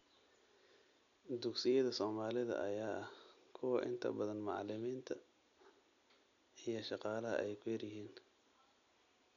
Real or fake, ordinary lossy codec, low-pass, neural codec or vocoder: real; none; 7.2 kHz; none